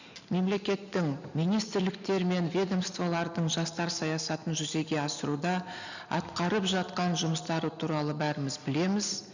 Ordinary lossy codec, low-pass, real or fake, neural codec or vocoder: none; 7.2 kHz; real; none